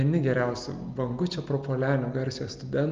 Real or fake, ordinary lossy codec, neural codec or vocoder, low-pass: real; Opus, 24 kbps; none; 7.2 kHz